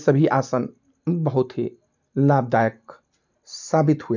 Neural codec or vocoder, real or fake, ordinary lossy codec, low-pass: none; real; none; 7.2 kHz